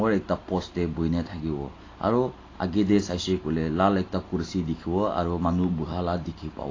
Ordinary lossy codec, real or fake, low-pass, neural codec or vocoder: AAC, 32 kbps; real; 7.2 kHz; none